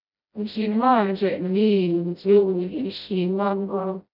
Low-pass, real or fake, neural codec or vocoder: 5.4 kHz; fake; codec, 16 kHz, 0.5 kbps, FreqCodec, smaller model